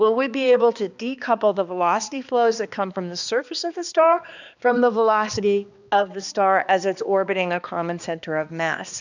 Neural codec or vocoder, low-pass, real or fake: codec, 16 kHz, 2 kbps, X-Codec, HuBERT features, trained on balanced general audio; 7.2 kHz; fake